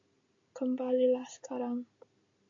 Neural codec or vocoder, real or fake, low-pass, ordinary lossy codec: none; real; 7.2 kHz; AAC, 64 kbps